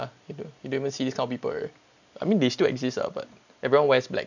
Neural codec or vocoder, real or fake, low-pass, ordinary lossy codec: none; real; 7.2 kHz; none